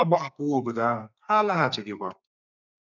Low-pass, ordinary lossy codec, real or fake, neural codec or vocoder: 7.2 kHz; none; fake; codec, 32 kHz, 1.9 kbps, SNAC